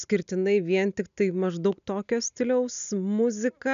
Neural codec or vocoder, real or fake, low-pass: none; real; 7.2 kHz